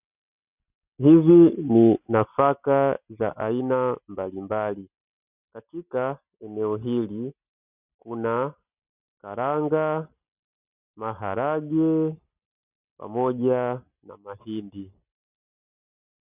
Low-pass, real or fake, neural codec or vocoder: 3.6 kHz; real; none